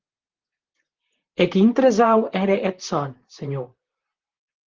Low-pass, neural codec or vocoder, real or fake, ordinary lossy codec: 7.2 kHz; vocoder, 44.1 kHz, 128 mel bands, Pupu-Vocoder; fake; Opus, 16 kbps